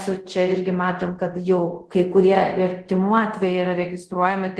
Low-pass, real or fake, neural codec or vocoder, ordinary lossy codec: 10.8 kHz; fake; codec, 24 kHz, 0.5 kbps, DualCodec; Opus, 16 kbps